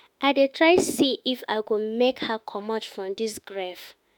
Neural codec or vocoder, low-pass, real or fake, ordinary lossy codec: autoencoder, 48 kHz, 32 numbers a frame, DAC-VAE, trained on Japanese speech; none; fake; none